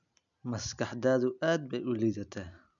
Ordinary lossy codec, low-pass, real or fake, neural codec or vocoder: none; 7.2 kHz; real; none